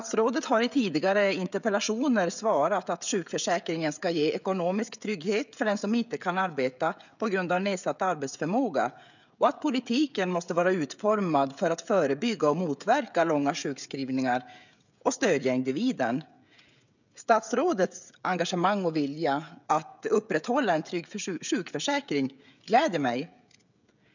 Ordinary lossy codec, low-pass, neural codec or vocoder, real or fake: none; 7.2 kHz; codec, 16 kHz, 16 kbps, FreqCodec, smaller model; fake